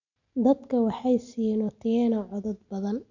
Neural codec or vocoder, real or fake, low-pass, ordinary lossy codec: none; real; 7.2 kHz; none